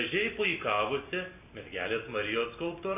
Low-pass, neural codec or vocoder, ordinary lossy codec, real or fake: 3.6 kHz; none; AAC, 32 kbps; real